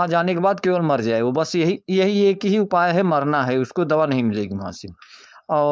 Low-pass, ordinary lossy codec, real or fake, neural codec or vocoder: none; none; fake; codec, 16 kHz, 4.8 kbps, FACodec